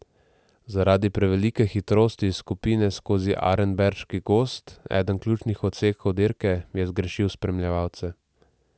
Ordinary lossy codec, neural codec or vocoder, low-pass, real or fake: none; none; none; real